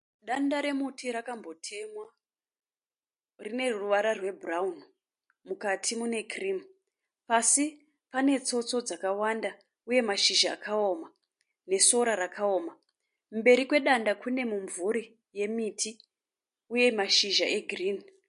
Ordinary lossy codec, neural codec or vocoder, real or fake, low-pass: MP3, 48 kbps; none; real; 10.8 kHz